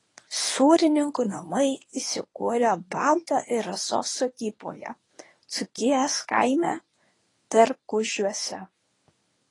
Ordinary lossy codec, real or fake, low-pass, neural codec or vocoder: AAC, 32 kbps; fake; 10.8 kHz; codec, 24 kHz, 0.9 kbps, WavTokenizer, medium speech release version 1